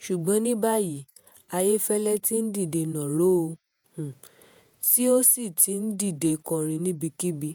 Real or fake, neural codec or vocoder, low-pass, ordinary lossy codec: fake; vocoder, 48 kHz, 128 mel bands, Vocos; none; none